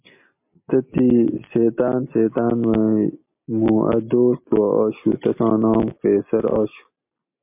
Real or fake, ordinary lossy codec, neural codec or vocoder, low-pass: real; MP3, 32 kbps; none; 3.6 kHz